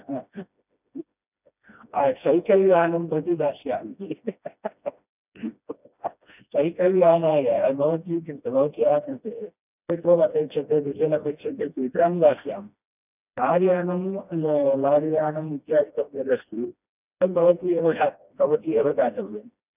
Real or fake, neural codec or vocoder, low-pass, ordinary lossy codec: fake; codec, 16 kHz, 1 kbps, FreqCodec, smaller model; 3.6 kHz; none